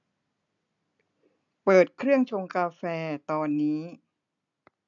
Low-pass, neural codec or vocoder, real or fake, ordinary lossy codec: 7.2 kHz; none; real; AAC, 64 kbps